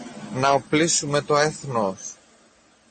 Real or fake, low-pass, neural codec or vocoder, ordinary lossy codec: real; 9.9 kHz; none; MP3, 32 kbps